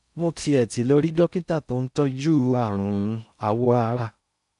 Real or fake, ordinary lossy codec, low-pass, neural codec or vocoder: fake; none; 10.8 kHz; codec, 16 kHz in and 24 kHz out, 0.6 kbps, FocalCodec, streaming, 4096 codes